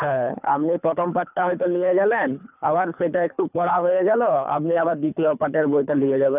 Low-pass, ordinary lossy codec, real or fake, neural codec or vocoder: 3.6 kHz; none; fake; codec, 24 kHz, 3 kbps, HILCodec